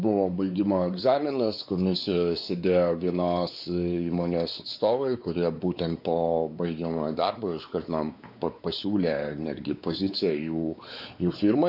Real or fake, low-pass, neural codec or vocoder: fake; 5.4 kHz; codec, 16 kHz, 4 kbps, X-Codec, WavLM features, trained on Multilingual LibriSpeech